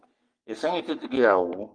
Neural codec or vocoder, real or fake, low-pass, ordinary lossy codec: codec, 44.1 kHz, 3.4 kbps, Pupu-Codec; fake; 9.9 kHz; Opus, 32 kbps